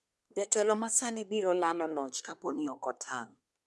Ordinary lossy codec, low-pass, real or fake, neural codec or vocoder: none; none; fake; codec, 24 kHz, 1 kbps, SNAC